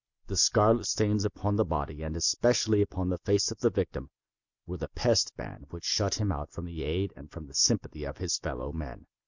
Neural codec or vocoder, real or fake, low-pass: none; real; 7.2 kHz